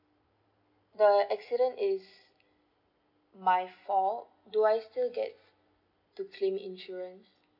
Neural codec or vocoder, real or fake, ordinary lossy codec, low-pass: none; real; none; 5.4 kHz